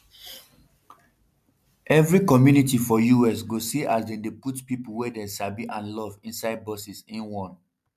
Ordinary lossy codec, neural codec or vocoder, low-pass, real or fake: MP3, 96 kbps; none; 14.4 kHz; real